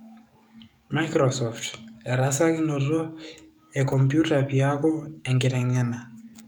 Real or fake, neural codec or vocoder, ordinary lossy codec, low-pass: fake; codec, 44.1 kHz, 7.8 kbps, DAC; none; 19.8 kHz